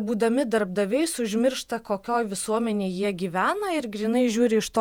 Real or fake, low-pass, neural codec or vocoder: fake; 19.8 kHz; vocoder, 48 kHz, 128 mel bands, Vocos